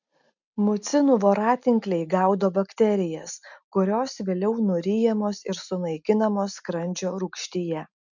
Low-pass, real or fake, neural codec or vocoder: 7.2 kHz; real; none